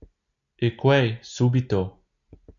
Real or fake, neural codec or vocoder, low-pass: real; none; 7.2 kHz